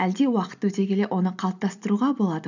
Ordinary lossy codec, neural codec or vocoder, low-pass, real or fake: none; none; 7.2 kHz; real